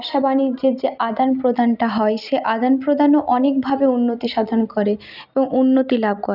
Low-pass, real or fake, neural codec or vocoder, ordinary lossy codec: 5.4 kHz; real; none; none